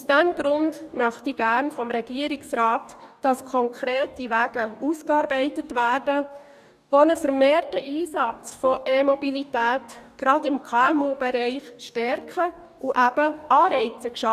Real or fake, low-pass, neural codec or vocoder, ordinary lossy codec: fake; 14.4 kHz; codec, 44.1 kHz, 2.6 kbps, DAC; none